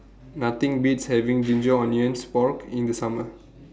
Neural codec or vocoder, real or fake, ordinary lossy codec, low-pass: none; real; none; none